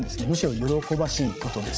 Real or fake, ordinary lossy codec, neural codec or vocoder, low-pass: fake; none; codec, 16 kHz, 16 kbps, FreqCodec, larger model; none